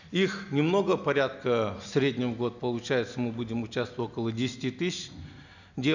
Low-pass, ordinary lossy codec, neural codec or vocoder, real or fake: 7.2 kHz; none; none; real